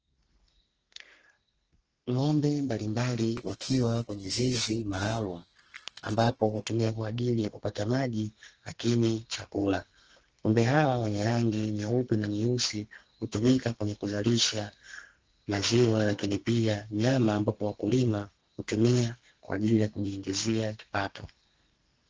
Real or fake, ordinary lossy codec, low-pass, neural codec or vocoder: fake; Opus, 16 kbps; 7.2 kHz; codec, 32 kHz, 1.9 kbps, SNAC